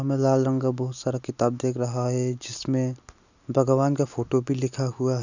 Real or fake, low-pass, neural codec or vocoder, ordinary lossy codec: real; 7.2 kHz; none; none